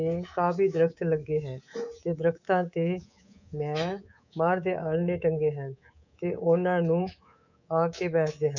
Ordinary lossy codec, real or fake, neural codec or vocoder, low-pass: none; fake; codec, 24 kHz, 3.1 kbps, DualCodec; 7.2 kHz